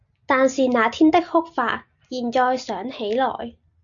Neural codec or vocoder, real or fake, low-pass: none; real; 7.2 kHz